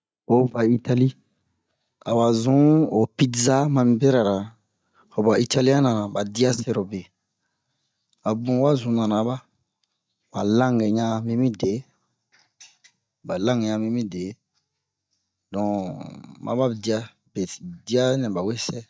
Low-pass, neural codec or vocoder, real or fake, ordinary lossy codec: none; none; real; none